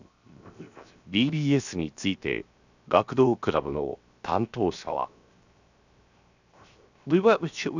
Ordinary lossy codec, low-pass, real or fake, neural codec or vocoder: none; 7.2 kHz; fake; codec, 16 kHz, 0.7 kbps, FocalCodec